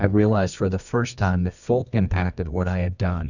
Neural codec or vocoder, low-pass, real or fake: codec, 24 kHz, 0.9 kbps, WavTokenizer, medium music audio release; 7.2 kHz; fake